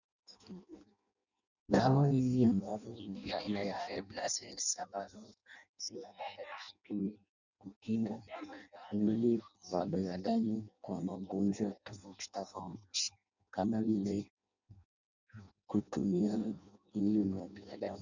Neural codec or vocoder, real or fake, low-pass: codec, 16 kHz in and 24 kHz out, 0.6 kbps, FireRedTTS-2 codec; fake; 7.2 kHz